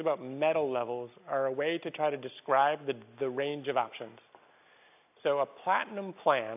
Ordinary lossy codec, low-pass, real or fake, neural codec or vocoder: AAC, 32 kbps; 3.6 kHz; real; none